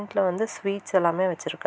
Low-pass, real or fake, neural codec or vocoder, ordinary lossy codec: none; real; none; none